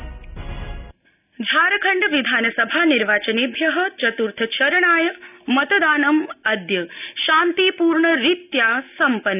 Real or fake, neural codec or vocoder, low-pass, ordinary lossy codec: real; none; 3.6 kHz; none